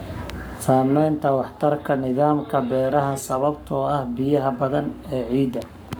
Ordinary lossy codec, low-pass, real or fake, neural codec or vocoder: none; none; fake; codec, 44.1 kHz, 7.8 kbps, Pupu-Codec